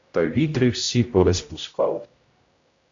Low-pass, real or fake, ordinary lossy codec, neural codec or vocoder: 7.2 kHz; fake; AAC, 64 kbps; codec, 16 kHz, 0.5 kbps, X-Codec, HuBERT features, trained on general audio